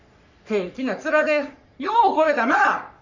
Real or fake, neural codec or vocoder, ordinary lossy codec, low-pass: fake; codec, 44.1 kHz, 3.4 kbps, Pupu-Codec; none; 7.2 kHz